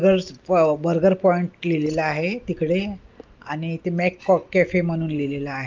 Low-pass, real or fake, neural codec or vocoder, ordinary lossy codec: 7.2 kHz; real; none; Opus, 24 kbps